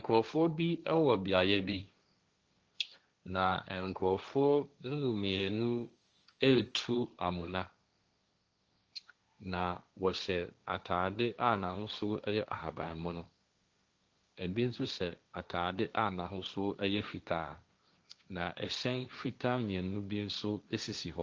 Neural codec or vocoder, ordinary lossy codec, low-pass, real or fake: codec, 16 kHz, 1.1 kbps, Voila-Tokenizer; Opus, 24 kbps; 7.2 kHz; fake